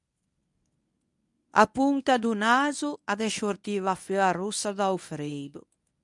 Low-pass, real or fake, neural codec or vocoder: 10.8 kHz; fake; codec, 24 kHz, 0.9 kbps, WavTokenizer, medium speech release version 1